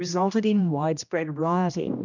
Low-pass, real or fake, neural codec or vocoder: 7.2 kHz; fake; codec, 16 kHz, 1 kbps, X-Codec, HuBERT features, trained on general audio